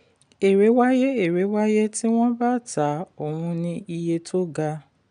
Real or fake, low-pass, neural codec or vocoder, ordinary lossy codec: real; 9.9 kHz; none; none